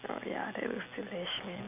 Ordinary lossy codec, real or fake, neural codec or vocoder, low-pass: none; real; none; 3.6 kHz